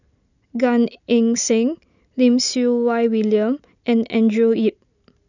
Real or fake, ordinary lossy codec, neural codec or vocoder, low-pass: real; none; none; 7.2 kHz